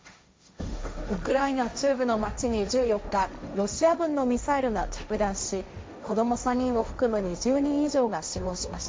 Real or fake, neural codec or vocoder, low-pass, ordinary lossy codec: fake; codec, 16 kHz, 1.1 kbps, Voila-Tokenizer; none; none